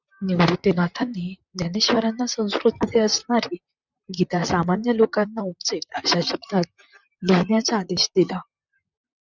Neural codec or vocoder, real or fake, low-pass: vocoder, 44.1 kHz, 128 mel bands, Pupu-Vocoder; fake; 7.2 kHz